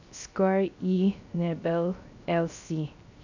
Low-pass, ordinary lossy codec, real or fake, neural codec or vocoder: 7.2 kHz; none; fake; codec, 16 kHz, 0.3 kbps, FocalCodec